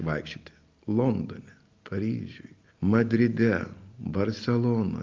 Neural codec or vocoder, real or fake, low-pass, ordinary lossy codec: none; real; 7.2 kHz; Opus, 32 kbps